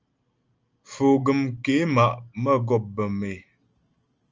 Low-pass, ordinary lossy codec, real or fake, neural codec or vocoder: 7.2 kHz; Opus, 24 kbps; real; none